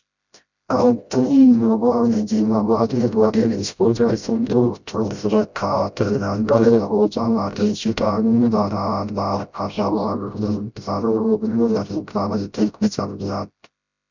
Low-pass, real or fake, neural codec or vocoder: 7.2 kHz; fake; codec, 16 kHz, 0.5 kbps, FreqCodec, smaller model